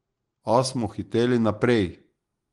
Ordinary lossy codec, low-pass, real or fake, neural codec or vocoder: Opus, 24 kbps; 10.8 kHz; real; none